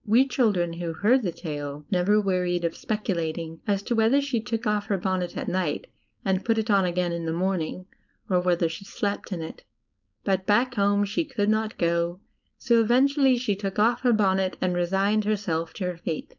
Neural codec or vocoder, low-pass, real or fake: codec, 16 kHz, 4.8 kbps, FACodec; 7.2 kHz; fake